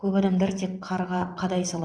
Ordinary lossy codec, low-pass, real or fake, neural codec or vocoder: none; 9.9 kHz; fake; vocoder, 22.05 kHz, 80 mel bands, WaveNeXt